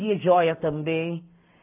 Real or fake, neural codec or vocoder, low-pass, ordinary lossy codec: real; none; 3.6 kHz; AAC, 24 kbps